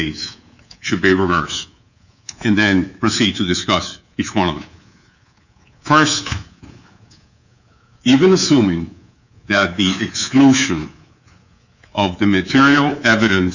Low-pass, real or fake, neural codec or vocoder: 7.2 kHz; fake; codec, 24 kHz, 3.1 kbps, DualCodec